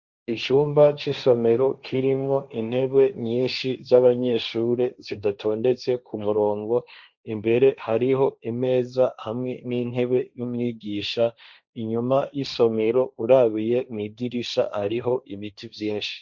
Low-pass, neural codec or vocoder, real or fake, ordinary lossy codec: 7.2 kHz; codec, 16 kHz, 1.1 kbps, Voila-Tokenizer; fake; Opus, 64 kbps